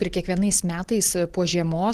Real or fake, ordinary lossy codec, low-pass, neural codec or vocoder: real; Opus, 16 kbps; 14.4 kHz; none